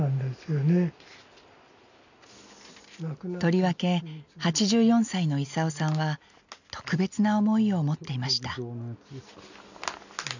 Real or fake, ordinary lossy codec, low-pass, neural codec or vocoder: real; none; 7.2 kHz; none